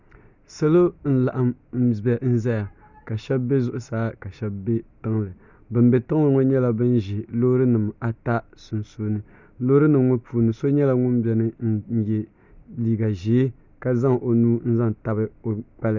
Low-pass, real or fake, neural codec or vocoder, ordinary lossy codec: 7.2 kHz; real; none; Opus, 64 kbps